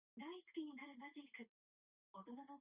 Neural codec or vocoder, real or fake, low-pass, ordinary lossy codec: none; real; 3.6 kHz; Opus, 64 kbps